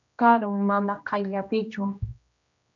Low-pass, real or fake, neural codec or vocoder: 7.2 kHz; fake; codec, 16 kHz, 1 kbps, X-Codec, HuBERT features, trained on general audio